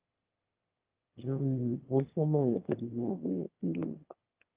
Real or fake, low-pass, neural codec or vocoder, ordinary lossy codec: fake; 3.6 kHz; autoencoder, 22.05 kHz, a latent of 192 numbers a frame, VITS, trained on one speaker; Opus, 32 kbps